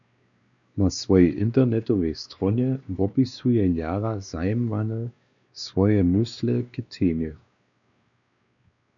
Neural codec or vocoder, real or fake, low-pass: codec, 16 kHz, 2 kbps, X-Codec, WavLM features, trained on Multilingual LibriSpeech; fake; 7.2 kHz